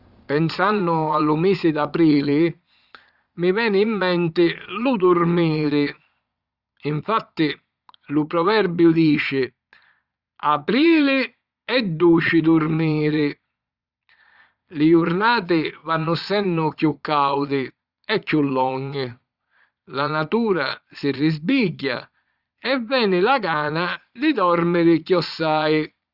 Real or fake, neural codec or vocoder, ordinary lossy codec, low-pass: fake; vocoder, 22.05 kHz, 80 mel bands, Vocos; Opus, 64 kbps; 5.4 kHz